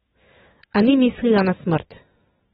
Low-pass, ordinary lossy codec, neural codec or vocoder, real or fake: 19.8 kHz; AAC, 16 kbps; none; real